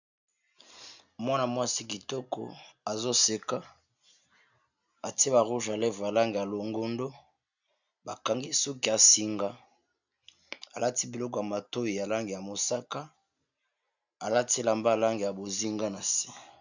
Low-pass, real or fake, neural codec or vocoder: 7.2 kHz; real; none